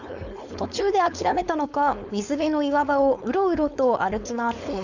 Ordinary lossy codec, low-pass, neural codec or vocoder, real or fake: none; 7.2 kHz; codec, 16 kHz, 4.8 kbps, FACodec; fake